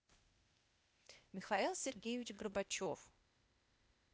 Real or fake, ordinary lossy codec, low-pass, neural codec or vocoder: fake; none; none; codec, 16 kHz, 0.8 kbps, ZipCodec